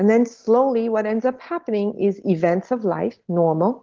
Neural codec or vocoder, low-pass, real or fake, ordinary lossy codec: none; 7.2 kHz; real; Opus, 16 kbps